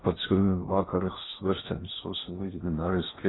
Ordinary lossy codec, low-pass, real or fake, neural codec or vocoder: AAC, 16 kbps; 7.2 kHz; fake; codec, 16 kHz in and 24 kHz out, 0.6 kbps, FocalCodec, streaming, 4096 codes